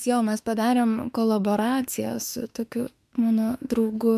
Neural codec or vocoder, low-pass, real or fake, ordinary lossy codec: autoencoder, 48 kHz, 32 numbers a frame, DAC-VAE, trained on Japanese speech; 14.4 kHz; fake; MP3, 96 kbps